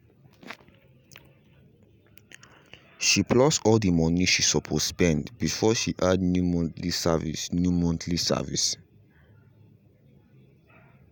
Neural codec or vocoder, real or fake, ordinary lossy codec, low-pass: none; real; none; none